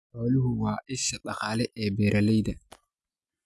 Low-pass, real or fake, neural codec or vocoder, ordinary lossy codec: none; real; none; none